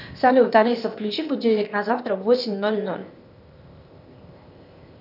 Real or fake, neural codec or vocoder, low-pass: fake; codec, 16 kHz, 0.8 kbps, ZipCodec; 5.4 kHz